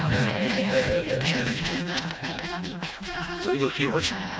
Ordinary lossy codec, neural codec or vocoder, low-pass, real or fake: none; codec, 16 kHz, 1 kbps, FreqCodec, smaller model; none; fake